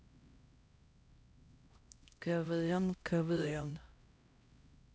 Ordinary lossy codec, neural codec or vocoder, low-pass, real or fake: none; codec, 16 kHz, 0.5 kbps, X-Codec, HuBERT features, trained on LibriSpeech; none; fake